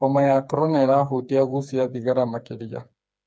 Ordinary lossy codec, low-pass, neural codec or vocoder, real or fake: none; none; codec, 16 kHz, 4 kbps, FreqCodec, smaller model; fake